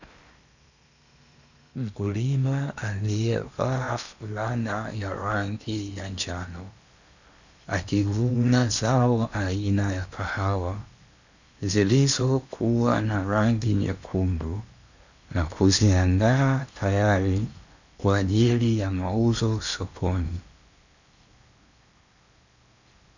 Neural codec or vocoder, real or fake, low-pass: codec, 16 kHz in and 24 kHz out, 0.8 kbps, FocalCodec, streaming, 65536 codes; fake; 7.2 kHz